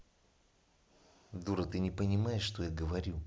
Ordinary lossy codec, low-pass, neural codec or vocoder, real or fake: none; none; none; real